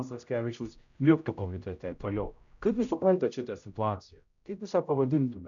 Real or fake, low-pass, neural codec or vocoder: fake; 7.2 kHz; codec, 16 kHz, 0.5 kbps, X-Codec, HuBERT features, trained on general audio